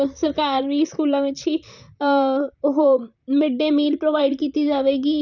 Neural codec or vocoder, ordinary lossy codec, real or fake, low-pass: vocoder, 44.1 kHz, 128 mel bands, Pupu-Vocoder; none; fake; 7.2 kHz